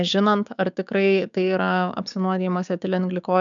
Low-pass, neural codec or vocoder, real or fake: 7.2 kHz; codec, 16 kHz, 6 kbps, DAC; fake